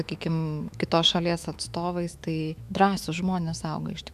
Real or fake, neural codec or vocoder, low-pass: fake; autoencoder, 48 kHz, 128 numbers a frame, DAC-VAE, trained on Japanese speech; 14.4 kHz